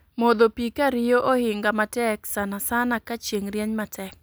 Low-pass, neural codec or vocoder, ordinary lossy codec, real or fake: none; none; none; real